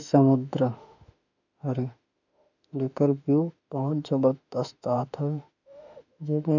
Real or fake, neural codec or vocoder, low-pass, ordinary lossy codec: fake; autoencoder, 48 kHz, 32 numbers a frame, DAC-VAE, trained on Japanese speech; 7.2 kHz; none